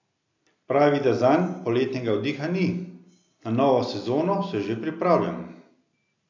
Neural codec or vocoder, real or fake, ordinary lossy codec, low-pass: none; real; MP3, 64 kbps; 7.2 kHz